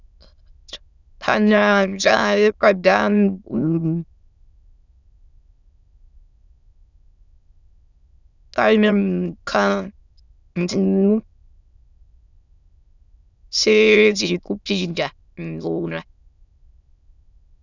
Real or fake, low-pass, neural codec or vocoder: fake; 7.2 kHz; autoencoder, 22.05 kHz, a latent of 192 numbers a frame, VITS, trained on many speakers